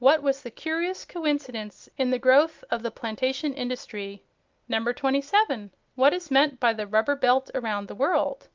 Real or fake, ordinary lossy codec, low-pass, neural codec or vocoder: real; Opus, 24 kbps; 7.2 kHz; none